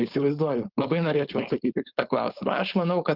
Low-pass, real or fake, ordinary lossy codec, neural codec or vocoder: 5.4 kHz; fake; Opus, 32 kbps; codec, 16 kHz, 4.8 kbps, FACodec